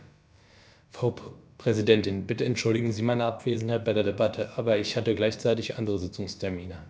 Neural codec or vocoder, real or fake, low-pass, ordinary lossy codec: codec, 16 kHz, about 1 kbps, DyCAST, with the encoder's durations; fake; none; none